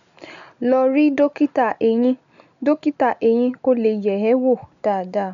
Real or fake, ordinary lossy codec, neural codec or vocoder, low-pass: real; none; none; 7.2 kHz